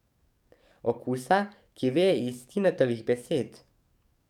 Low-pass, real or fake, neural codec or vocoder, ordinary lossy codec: 19.8 kHz; fake; codec, 44.1 kHz, 7.8 kbps, DAC; none